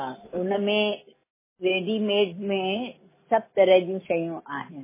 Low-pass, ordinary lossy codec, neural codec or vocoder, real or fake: 3.6 kHz; MP3, 16 kbps; none; real